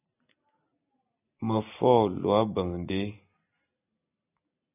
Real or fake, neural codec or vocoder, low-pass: real; none; 3.6 kHz